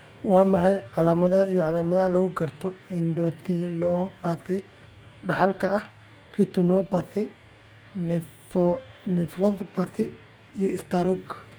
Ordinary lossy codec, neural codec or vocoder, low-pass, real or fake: none; codec, 44.1 kHz, 2.6 kbps, DAC; none; fake